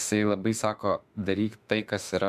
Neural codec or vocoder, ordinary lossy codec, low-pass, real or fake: autoencoder, 48 kHz, 32 numbers a frame, DAC-VAE, trained on Japanese speech; MP3, 96 kbps; 14.4 kHz; fake